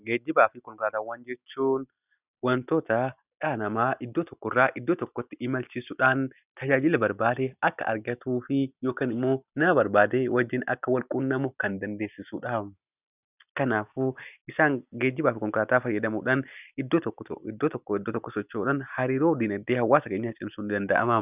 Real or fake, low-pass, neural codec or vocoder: real; 3.6 kHz; none